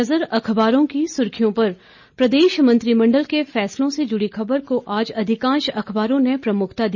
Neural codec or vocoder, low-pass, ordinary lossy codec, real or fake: none; 7.2 kHz; none; real